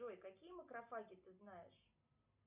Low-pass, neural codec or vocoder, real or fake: 3.6 kHz; none; real